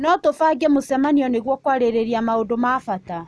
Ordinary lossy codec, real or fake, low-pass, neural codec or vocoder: none; real; none; none